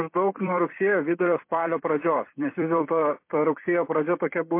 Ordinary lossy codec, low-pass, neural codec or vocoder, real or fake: MP3, 24 kbps; 3.6 kHz; vocoder, 44.1 kHz, 128 mel bands every 256 samples, BigVGAN v2; fake